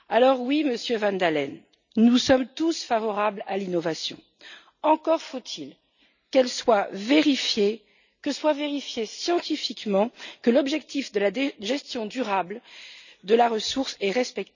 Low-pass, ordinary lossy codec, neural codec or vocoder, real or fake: 7.2 kHz; none; none; real